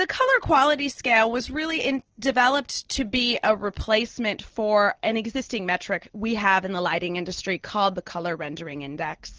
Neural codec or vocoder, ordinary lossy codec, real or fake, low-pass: none; Opus, 16 kbps; real; 7.2 kHz